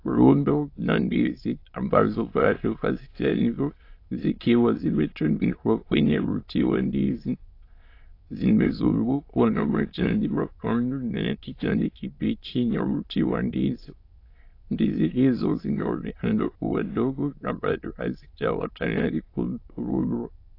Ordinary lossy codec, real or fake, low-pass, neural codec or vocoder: AAC, 32 kbps; fake; 5.4 kHz; autoencoder, 22.05 kHz, a latent of 192 numbers a frame, VITS, trained on many speakers